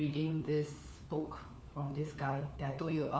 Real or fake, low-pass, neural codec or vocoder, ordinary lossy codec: fake; none; codec, 16 kHz, 4 kbps, FunCodec, trained on LibriTTS, 50 frames a second; none